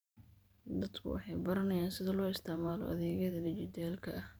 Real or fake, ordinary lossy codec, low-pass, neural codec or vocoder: fake; none; none; vocoder, 44.1 kHz, 128 mel bands every 512 samples, BigVGAN v2